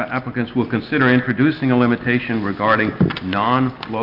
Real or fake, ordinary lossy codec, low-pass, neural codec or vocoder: real; Opus, 24 kbps; 5.4 kHz; none